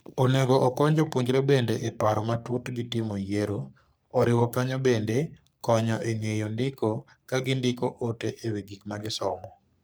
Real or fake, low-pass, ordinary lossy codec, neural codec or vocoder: fake; none; none; codec, 44.1 kHz, 3.4 kbps, Pupu-Codec